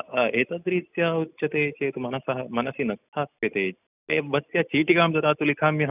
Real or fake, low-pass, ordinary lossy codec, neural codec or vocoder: real; 3.6 kHz; none; none